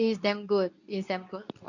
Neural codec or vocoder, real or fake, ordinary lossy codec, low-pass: codec, 24 kHz, 0.9 kbps, WavTokenizer, medium speech release version 2; fake; none; 7.2 kHz